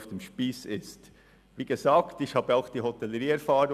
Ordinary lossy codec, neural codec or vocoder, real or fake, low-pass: none; none; real; 14.4 kHz